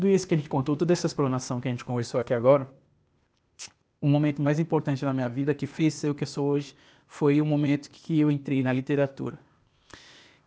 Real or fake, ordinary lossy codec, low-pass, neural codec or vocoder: fake; none; none; codec, 16 kHz, 0.8 kbps, ZipCodec